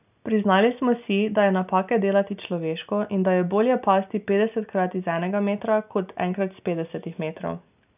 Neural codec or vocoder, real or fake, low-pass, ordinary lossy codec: none; real; 3.6 kHz; none